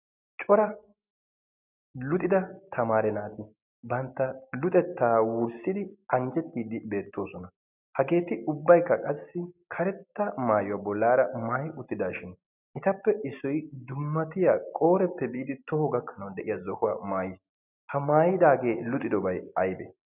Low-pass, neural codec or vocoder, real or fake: 3.6 kHz; none; real